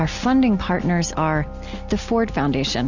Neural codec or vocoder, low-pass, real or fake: none; 7.2 kHz; real